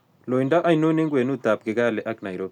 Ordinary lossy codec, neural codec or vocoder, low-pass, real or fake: MP3, 96 kbps; none; 19.8 kHz; real